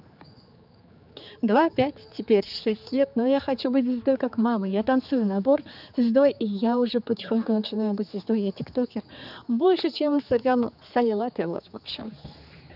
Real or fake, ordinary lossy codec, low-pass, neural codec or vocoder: fake; none; 5.4 kHz; codec, 16 kHz, 4 kbps, X-Codec, HuBERT features, trained on general audio